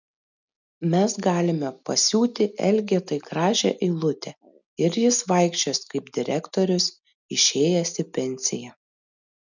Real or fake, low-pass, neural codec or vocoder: real; 7.2 kHz; none